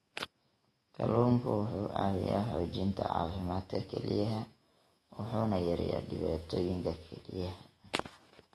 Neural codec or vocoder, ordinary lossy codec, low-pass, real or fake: autoencoder, 48 kHz, 128 numbers a frame, DAC-VAE, trained on Japanese speech; AAC, 32 kbps; 19.8 kHz; fake